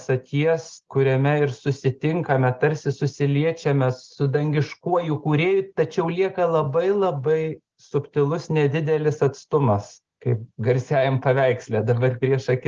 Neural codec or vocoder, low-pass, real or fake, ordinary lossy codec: none; 7.2 kHz; real; Opus, 16 kbps